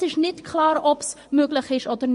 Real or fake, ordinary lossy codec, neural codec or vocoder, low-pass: fake; MP3, 48 kbps; vocoder, 24 kHz, 100 mel bands, Vocos; 10.8 kHz